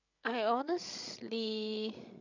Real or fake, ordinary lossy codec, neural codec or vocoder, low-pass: fake; none; codec, 16 kHz, 16 kbps, FreqCodec, larger model; 7.2 kHz